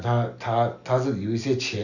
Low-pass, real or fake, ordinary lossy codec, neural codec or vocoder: 7.2 kHz; real; none; none